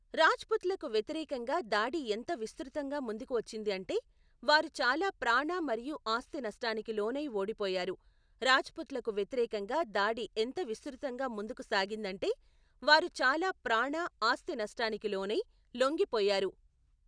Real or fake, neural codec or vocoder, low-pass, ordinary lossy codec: real; none; 9.9 kHz; none